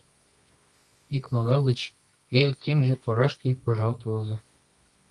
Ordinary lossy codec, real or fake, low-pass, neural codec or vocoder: Opus, 32 kbps; fake; 10.8 kHz; codec, 24 kHz, 0.9 kbps, WavTokenizer, medium music audio release